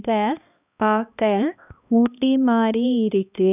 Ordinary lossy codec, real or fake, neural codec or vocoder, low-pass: none; fake; codec, 16 kHz, 1 kbps, X-Codec, HuBERT features, trained on balanced general audio; 3.6 kHz